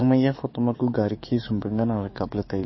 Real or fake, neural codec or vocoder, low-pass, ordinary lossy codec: fake; codec, 44.1 kHz, 7.8 kbps, DAC; 7.2 kHz; MP3, 24 kbps